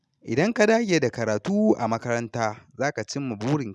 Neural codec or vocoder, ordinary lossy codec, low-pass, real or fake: none; none; none; real